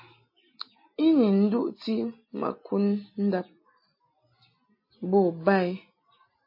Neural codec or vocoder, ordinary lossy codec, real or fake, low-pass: none; MP3, 24 kbps; real; 5.4 kHz